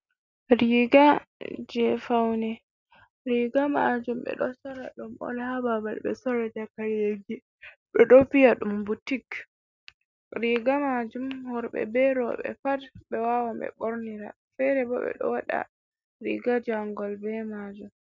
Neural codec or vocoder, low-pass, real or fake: none; 7.2 kHz; real